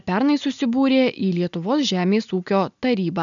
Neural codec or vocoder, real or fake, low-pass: none; real; 7.2 kHz